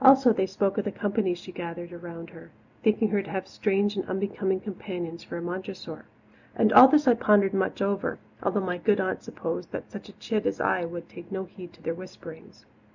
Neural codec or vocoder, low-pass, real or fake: none; 7.2 kHz; real